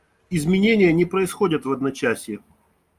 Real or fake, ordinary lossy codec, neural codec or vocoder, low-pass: real; Opus, 32 kbps; none; 14.4 kHz